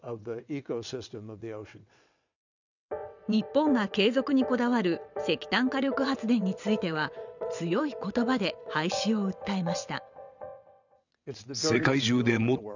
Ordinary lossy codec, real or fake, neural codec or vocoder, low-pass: none; fake; vocoder, 22.05 kHz, 80 mel bands, WaveNeXt; 7.2 kHz